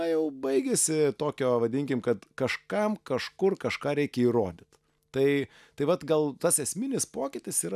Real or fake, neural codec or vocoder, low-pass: real; none; 14.4 kHz